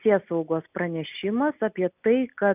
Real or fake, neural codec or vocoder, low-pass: real; none; 3.6 kHz